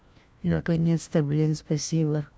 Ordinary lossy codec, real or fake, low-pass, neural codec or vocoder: none; fake; none; codec, 16 kHz, 1 kbps, FunCodec, trained on LibriTTS, 50 frames a second